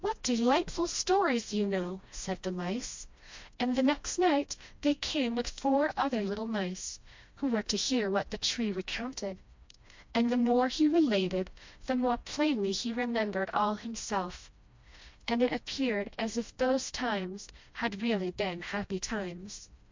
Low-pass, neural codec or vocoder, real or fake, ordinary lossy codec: 7.2 kHz; codec, 16 kHz, 1 kbps, FreqCodec, smaller model; fake; MP3, 48 kbps